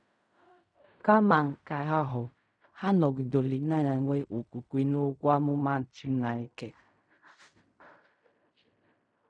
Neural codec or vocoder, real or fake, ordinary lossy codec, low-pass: codec, 16 kHz in and 24 kHz out, 0.4 kbps, LongCat-Audio-Codec, fine tuned four codebook decoder; fake; none; 9.9 kHz